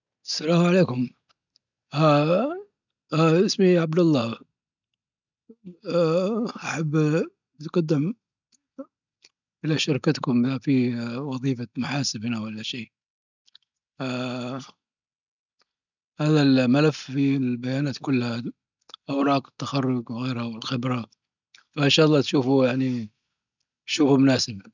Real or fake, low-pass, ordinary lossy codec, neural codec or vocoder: real; 7.2 kHz; none; none